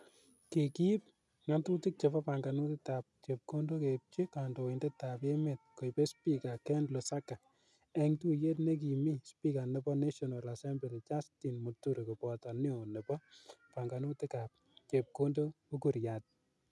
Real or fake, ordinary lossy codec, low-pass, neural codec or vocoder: real; none; none; none